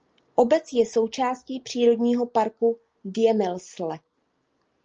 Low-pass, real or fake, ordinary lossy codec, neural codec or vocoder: 7.2 kHz; real; Opus, 24 kbps; none